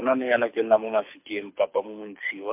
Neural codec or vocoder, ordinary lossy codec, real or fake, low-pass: codec, 24 kHz, 6 kbps, HILCodec; MP3, 32 kbps; fake; 3.6 kHz